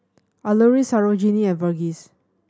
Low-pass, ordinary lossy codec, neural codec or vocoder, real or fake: none; none; none; real